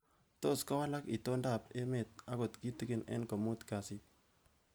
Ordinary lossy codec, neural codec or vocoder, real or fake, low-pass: none; none; real; none